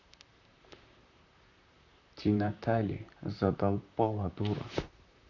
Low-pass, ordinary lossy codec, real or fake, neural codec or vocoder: 7.2 kHz; none; fake; vocoder, 44.1 kHz, 128 mel bands, Pupu-Vocoder